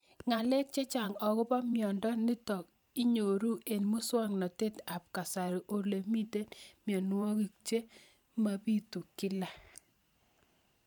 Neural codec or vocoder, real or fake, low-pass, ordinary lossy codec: vocoder, 44.1 kHz, 128 mel bands every 512 samples, BigVGAN v2; fake; none; none